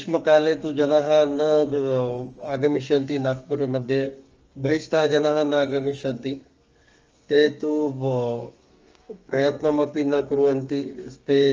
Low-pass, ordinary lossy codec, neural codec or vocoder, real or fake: 7.2 kHz; Opus, 24 kbps; codec, 32 kHz, 1.9 kbps, SNAC; fake